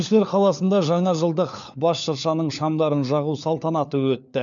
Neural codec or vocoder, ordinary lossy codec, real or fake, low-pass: codec, 16 kHz, 4 kbps, FunCodec, trained on LibriTTS, 50 frames a second; none; fake; 7.2 kHz